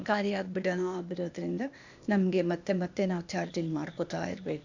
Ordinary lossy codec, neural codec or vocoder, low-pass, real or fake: none; codec, 16 kHz, 0.8 kbps, ZipCodec; 7.2 kHz; fake